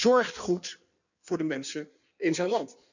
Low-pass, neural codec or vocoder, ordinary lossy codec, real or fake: 7.2 kHz; codec, 16 kHz in and 24 kHz out, 1.1 kbps, FireRedTTS-2 codec; none; fake